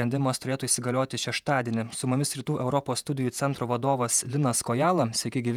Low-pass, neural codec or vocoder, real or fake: 19.8 kHz; vocoder, 48 kHz, 128 mel bands, Vocos; fake